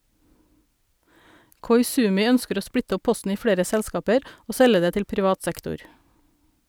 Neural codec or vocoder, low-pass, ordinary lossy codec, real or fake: none; none; none; real